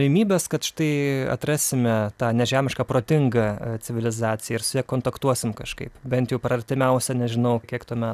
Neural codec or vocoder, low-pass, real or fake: none; 14.4 kHz; real